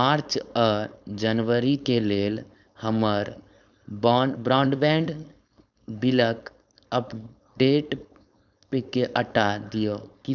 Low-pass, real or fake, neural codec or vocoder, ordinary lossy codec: 7.2 kHz; fake; codec, 16 kHz, 4.8 kbps, FACodec; none